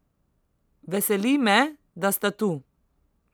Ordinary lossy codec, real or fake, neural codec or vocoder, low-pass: none; real; none; none